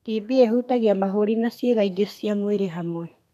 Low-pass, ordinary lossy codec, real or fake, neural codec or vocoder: 14.4 kHz; none; fake; codec, 32 kHz, 1.9 kbps, SNAC